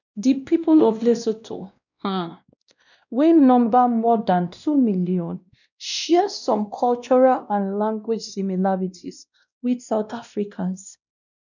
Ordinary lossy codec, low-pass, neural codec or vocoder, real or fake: none; 7.2 kHz; codec, 16 kHz, 1 kbps, X-Codec, WavLM features, trained on Multilingual LibriSpeech; fake